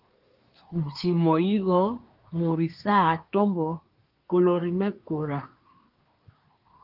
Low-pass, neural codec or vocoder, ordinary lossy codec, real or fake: 5.4 kHz; codec, 24 kHz, 1 kbps, SNAC; Opus, 24 kbps; fake